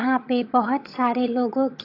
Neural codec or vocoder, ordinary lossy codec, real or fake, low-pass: vocoder, 22.05 kHz, 80 mel bands, HiFi-GAN; AAC, 32 kbps; fake; 5.4 kHz